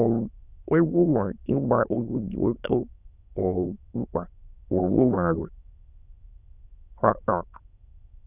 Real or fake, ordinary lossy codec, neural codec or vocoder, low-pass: fake; none; autoencoder, 22.05 kHz, a latent of 192 numbers a frame, VITS, trained on many speakers; 3.6 kHz